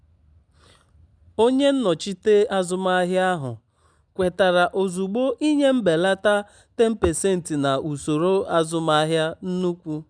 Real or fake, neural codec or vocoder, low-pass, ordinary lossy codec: real; none; 9.9 kHz; none